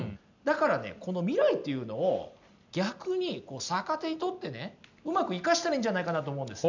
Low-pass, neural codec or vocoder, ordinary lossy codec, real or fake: 7.2 kHz; none; none; real